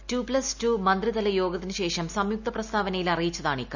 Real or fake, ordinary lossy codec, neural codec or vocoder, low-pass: real; none; none; 7.2 kHz